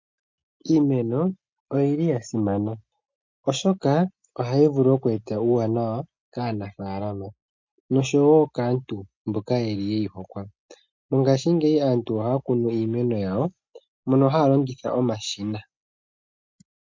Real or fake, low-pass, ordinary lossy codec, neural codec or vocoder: real; 7.2 kHz; MP3, 48 kbps; none